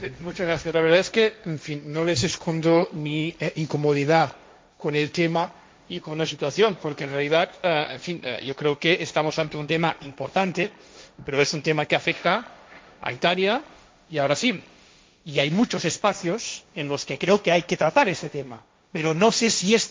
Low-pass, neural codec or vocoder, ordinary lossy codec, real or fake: none; codec, 16 kHz, 1.1 kbps, Voila-Tokenizer; none; fake